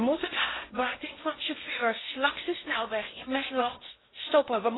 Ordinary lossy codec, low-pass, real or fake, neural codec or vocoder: AAC, 16 kbps; 7.2 kHz; fake; codec, 16 kHz in and 24 kHz out, 0.6 kbps, FocalCodec, streaming, 2048 codes